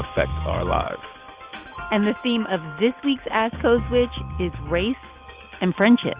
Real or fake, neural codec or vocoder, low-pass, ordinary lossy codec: real; none; 3.6 kHz; Opus, 24 kbps